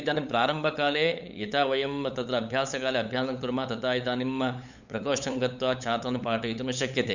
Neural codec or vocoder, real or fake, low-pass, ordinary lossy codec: codec, 16 kHz, 8 kbps, FunCodec, trained on LibriTTS, 25 frames a second; fake; 7.2 kHz; none